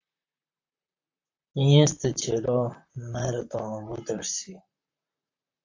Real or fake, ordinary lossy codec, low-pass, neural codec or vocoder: fake; AAC, 48 kbps; 7.2 kHz; vocoder, 44.1 kHz, 128 mel bands, Pupu-Vocoder